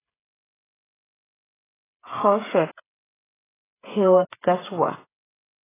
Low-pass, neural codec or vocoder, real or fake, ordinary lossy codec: 3.6 kHz; codec, 16 kHz, 16 kbps, FreqCodec, smaller model; fake; AAC, 16 kbps